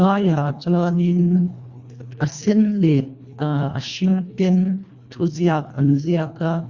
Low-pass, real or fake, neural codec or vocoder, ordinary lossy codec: 7.2 kHz; fake; codec, 24 kHz, 1.5 kbps, HILCodec; Opus, 64 kbps